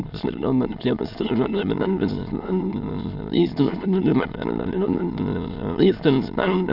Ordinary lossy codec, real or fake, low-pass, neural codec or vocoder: none; fake; 5.4 kHz; autoencoder, 22.05 kHz, a latent of 192 numbers a frame, VITS, trained on many speakers